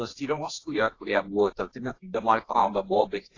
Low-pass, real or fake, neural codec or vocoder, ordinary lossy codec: 7.2 kHz; fake; codec, 24 kHz, 0.9 kbps, WavTokenizer, medium music audio release; AAC, 32 kbps